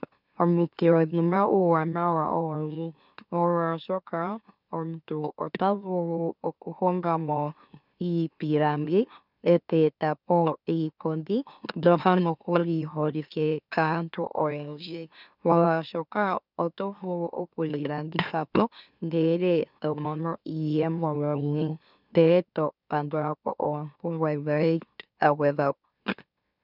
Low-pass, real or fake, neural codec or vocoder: 5.4 kHz; fake; autoencoder, 44.1 kHz, a latent of 192 numbers a frame, MeloTTS